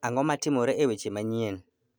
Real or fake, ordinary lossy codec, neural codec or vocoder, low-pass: real; none; none; none